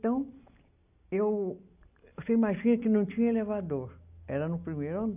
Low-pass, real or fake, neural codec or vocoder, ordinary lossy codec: 3.6 kHz; real; none; none